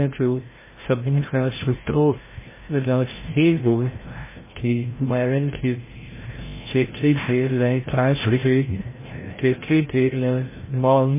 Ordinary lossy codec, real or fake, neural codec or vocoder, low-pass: MP3, 16 kbps; fake; codec, 16 kHz, 0.5 kbps, FreqCodec, larger model; 3.6 kHz